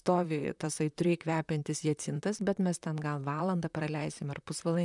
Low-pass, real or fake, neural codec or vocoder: 10.8 kHz; fake; vocoder, 44.1 kHz, 128 mel bands, Pupu-Vocoder